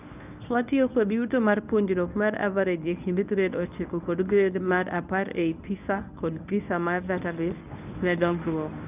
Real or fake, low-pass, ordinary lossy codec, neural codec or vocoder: fake; 3.6 kHz; none; codec, 24 kHz, 0.9 kbps, WavTokenizer, medium speech release version 1